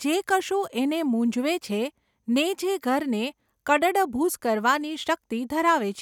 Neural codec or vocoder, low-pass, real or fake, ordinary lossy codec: none; 19.8 kHz; real; none